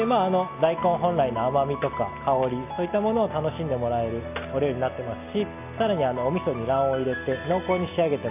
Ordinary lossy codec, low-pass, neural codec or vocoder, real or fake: none; 3.6 kHz; none; real